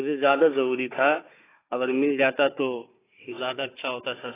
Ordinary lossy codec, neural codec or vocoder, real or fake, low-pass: AAC, 16 kbps; codec, 16 kHz, 4 kbps, FunCodec, trained on Chinese and English, 50 frames a second; fake; 3.6 kHz